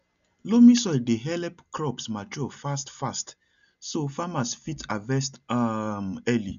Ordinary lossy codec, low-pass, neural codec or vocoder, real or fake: none; 7.2 kHz; none; real